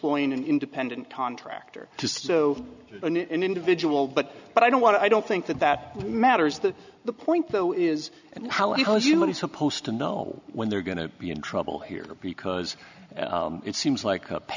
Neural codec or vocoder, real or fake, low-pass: none; real; 7.2 kHz